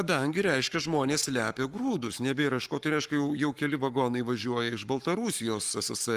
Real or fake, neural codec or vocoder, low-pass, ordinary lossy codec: fake; codec, 44.1 kHz, 7.8 kbps, Pupu-Codec; 14.4 kHz; Opus, 32 kbps